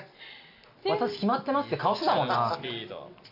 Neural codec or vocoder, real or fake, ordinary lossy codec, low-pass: none; real; none; 5.4 kHz